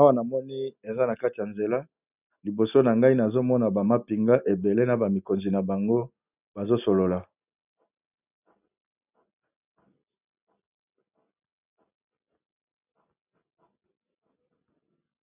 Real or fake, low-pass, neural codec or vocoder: real; 3.6 kHz; none